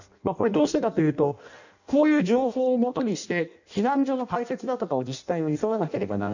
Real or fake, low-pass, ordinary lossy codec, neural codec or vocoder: fake; 7.2 kHz; none; codec, 16 kHz in and 24 kHz out, 0.6 kbps, FireRedTTS-2 codec